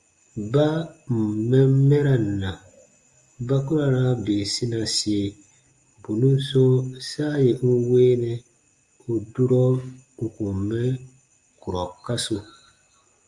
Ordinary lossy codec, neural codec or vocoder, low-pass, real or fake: Opus, 32 kbps; none; 10.8 kHz; real